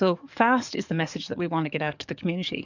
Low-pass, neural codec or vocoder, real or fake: 7.2 kHz; codec, 44.1 kHz, 7.8 kbps, DAC; fake